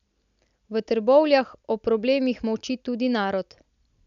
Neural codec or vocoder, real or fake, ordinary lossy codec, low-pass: none; real; none; 7.2 kHz